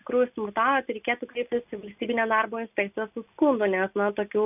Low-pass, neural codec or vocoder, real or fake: 3.6 kHz; none; real